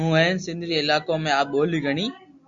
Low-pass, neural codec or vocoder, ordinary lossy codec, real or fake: 7.2 kHz; none; Opus, 64 kbps; real